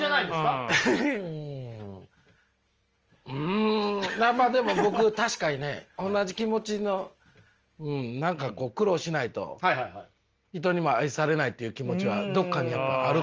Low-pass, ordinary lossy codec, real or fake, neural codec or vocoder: 7.2 kHz; Opus, 24 kbps; real; none